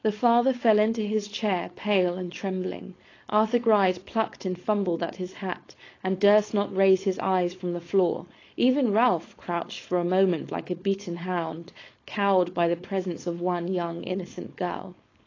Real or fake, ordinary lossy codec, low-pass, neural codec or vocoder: fake; AAC, 32 kbps; 7.2 kHz; codec, 16 kHz, 4.8 kbps, FACodec